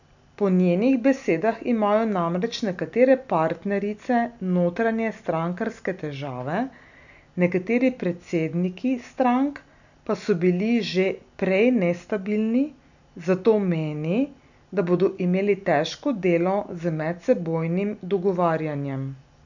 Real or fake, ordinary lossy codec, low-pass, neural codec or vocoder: real; none; 7.2 kHz; none